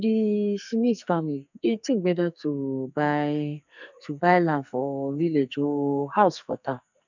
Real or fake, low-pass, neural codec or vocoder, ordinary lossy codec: fake; 7.2 kHz; codec, 44.1 kHz, 2.6 kbps, SNAC; none